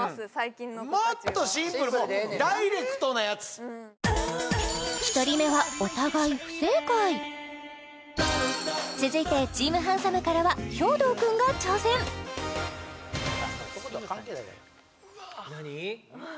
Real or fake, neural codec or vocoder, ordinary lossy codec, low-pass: real; none; none; none